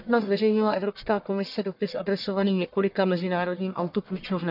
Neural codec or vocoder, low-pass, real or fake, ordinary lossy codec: codec, 44.1 kHz, 1.7 kbps, Pupu-Codec; 5.4 kHz; fake; none